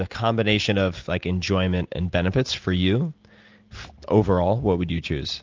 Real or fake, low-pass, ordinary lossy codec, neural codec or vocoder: real; 7.2 kHz; Opus, 24 kbps; none